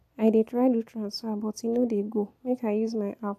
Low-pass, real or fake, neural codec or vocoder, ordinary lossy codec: 14.4 kHz; real; none; AAC, 64 kbps